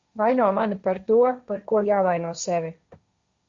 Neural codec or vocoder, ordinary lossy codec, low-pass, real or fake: codec, 16 kHz, 1.1 kbps, Voila-Tokenizer; Opus, 64 kbps; 7.2 kHz; fake